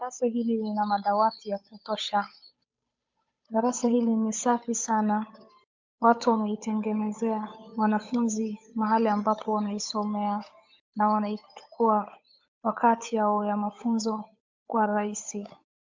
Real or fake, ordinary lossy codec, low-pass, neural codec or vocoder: fake; MP3, 64 kbps; 7.2 kHz; codec, 16 kHz, 8 kbps, FunCodec, trained on Chinese and English, 25 frames a second